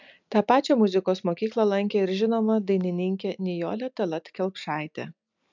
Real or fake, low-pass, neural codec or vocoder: real; 7.2 kHz; none